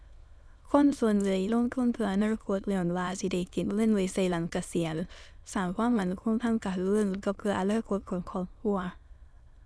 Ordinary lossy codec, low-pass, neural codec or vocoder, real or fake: none; none; autoencoder, 22.05 kHz, a latent of 192 numbers a frame, VITS, trained on many speakers; fake